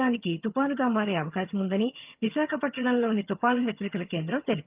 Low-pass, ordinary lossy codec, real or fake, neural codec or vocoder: 3.6 kHz; Opus, 32 kbps; fake; vocoder, 22.05 kHz, 80 mel bands, HiFi-GAN